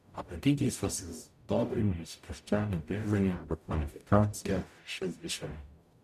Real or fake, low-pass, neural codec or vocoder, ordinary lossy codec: fake; 14.4 kHz; codec, 44.1 kHz, 0.9 kbps, DAC; MP3, 96 kbps